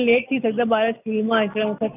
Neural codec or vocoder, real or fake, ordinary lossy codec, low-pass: none; real; none; 3.6 kHz